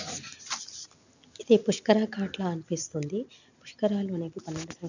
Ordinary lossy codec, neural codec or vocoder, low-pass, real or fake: none; none; 7.2 kHz; real